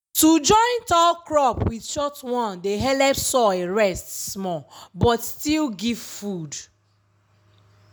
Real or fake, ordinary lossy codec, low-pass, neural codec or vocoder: real; none; none; none